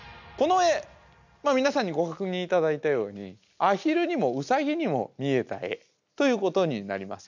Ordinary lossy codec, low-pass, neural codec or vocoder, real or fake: none; 7.2 kHz; none; real